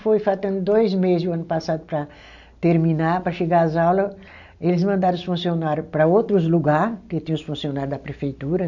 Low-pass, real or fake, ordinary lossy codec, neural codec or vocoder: 7.2 kHz; real; none; none